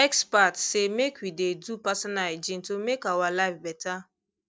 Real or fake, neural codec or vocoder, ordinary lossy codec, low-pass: real; none; none; none